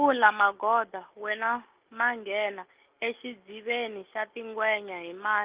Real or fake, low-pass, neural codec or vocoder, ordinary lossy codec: real; 3.6 kHz; none; Opus, 24 kbps